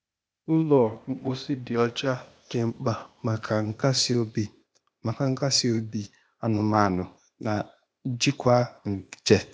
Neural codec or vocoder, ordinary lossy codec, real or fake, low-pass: codec, 16 kHz, 0.8 kbps, ZipCodec; none; fake; none